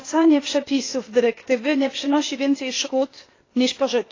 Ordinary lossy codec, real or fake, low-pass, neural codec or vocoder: AAC, 32 kbps; fake; 7.2 kHz; codec, 16 kHz, 0.8 kbps, ZipCodec